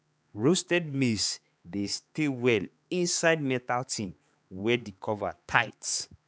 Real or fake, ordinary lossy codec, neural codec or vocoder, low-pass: fake; none; codec, 16 kHz, 4 kbps, X-Codec, HuBERT features, trained on balanced general audio; none